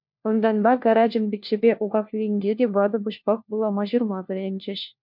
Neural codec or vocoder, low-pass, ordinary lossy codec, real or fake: codec, 16 kHz, 1 kbps, FunCodec, trained on LibriTTS, 50 frames a second; 5.4 kHz; MP3, 48 kbps; fake